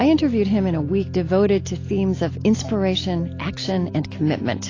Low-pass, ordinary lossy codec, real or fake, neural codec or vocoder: 7.2 kHz; AAC, 32 kbps; real; none